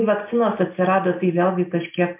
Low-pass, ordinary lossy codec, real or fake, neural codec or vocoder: 3.6 kHz; MP3, 32 kbps; real; none